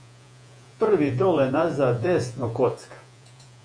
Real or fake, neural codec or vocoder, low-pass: fake; vocoder, 48 kHz, 128 mel bands, Vocos; 9.9 kHz